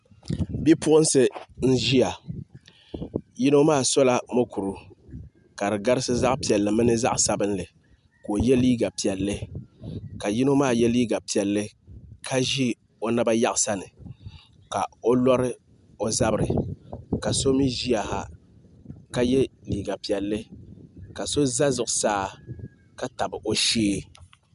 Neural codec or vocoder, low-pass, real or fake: none; 10.8 kHz; real